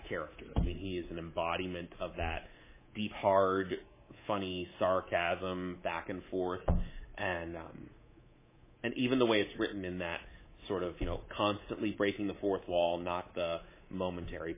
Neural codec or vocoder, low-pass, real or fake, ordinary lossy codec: codec, 24 kHz, 3.1 kbps, DualCodec; 3.6 kHz; fake; MP3, 16 kbps